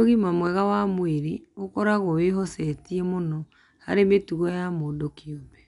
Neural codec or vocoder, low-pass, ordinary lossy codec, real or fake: none; 10.8 kHz; none; real